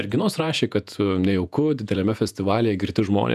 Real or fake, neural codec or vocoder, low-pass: fake; vocoder, 48 kHz, 128 mel bands, Vocos; 14.4 kHz